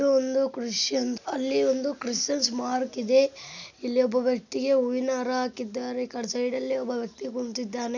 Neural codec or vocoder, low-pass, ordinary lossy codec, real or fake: none; 7.2 kHz; none; real